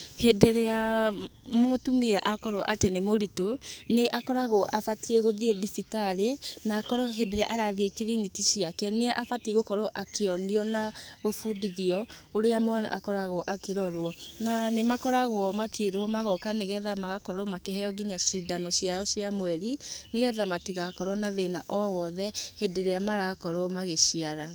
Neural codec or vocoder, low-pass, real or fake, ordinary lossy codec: codec, 44.1 kHz, 2.6 kbps, SNAC; none; fake; none